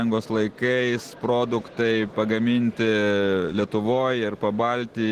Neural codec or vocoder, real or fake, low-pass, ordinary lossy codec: none; real; 14.4 kHz; Opus, 16 kbps